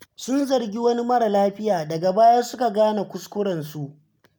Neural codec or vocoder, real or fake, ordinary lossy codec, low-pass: none; real; none; none